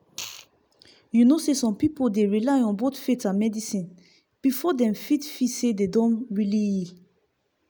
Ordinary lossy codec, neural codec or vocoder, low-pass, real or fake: none; none; none; real